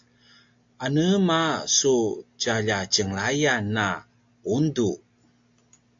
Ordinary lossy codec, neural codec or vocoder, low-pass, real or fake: AAC, 64 kbps; none; 7.2 kHz; real